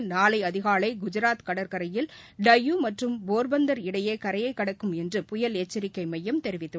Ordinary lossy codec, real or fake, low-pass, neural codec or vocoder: none; real; none; none